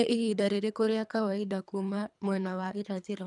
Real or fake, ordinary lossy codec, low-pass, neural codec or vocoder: fake; none; 10.8 kHz; codec, 24 kHz, 3 kbps, HILCodec